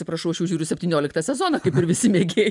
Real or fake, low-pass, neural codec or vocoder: real; 10.8 kHz; none